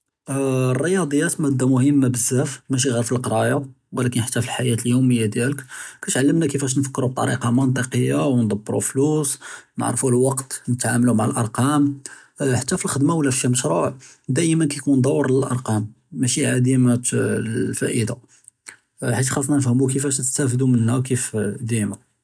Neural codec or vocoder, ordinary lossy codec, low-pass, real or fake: none; none; none; real